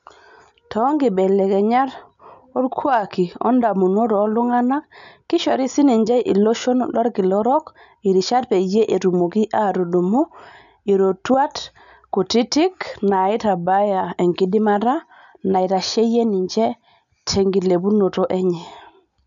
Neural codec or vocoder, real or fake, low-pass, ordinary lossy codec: none; real; 7.2 kHz; none